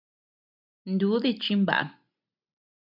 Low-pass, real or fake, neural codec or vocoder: 5.4 kHz; real; none